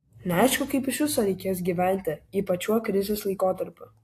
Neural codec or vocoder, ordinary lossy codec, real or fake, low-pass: none; AAC, 64 kbps; real; 14.4 kHz